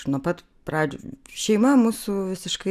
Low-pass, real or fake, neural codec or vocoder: 14.4 kHz; real; none